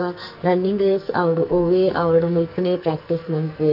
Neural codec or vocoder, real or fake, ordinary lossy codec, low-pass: codec, 44.1 kHz, 2.6 kbps, SNAC; fake; none; 5.4 kHz